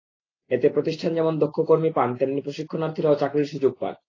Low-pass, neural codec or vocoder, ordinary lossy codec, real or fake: 7.2 kHz; none; AAC, 32 kbps; real